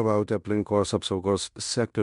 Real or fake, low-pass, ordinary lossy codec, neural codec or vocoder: fake; 10.8 kHz; MP3, 64 kbps; codec, 16 kHz in and 24 kHz out, 0.9 kbps, LongCat-Audio-Codec, fine tuned four codebook decoder